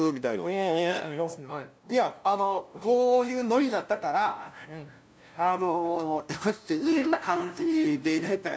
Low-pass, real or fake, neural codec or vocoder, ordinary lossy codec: none; fake; codec, 16 kHz, 0.5 kbps, FunCodec, trained on LibriTTS, 25 frames a second; none